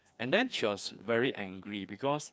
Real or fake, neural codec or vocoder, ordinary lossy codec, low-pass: fake; codec, 16 kHz, 2 kbps, FreqCodec, larger model; none; none